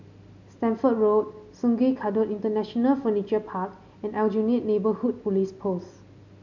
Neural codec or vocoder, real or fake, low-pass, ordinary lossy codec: none; real; 7.2 kHz; none